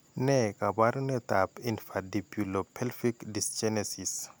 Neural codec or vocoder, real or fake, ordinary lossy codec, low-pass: none; real; none; none